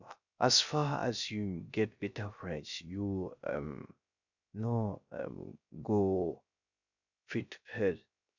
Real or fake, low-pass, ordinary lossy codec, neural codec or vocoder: fake; 7.2 kHz; none; codec, 16 kHz, 0.3 kbps, FocalCodec